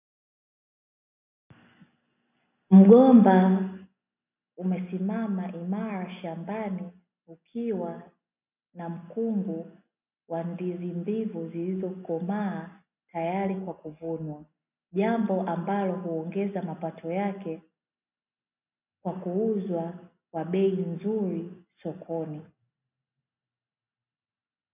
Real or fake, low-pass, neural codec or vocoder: real; 3.6 kHz; none